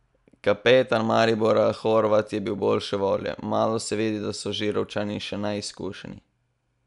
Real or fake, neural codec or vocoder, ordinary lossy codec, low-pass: real; none; none; 10.8 kHz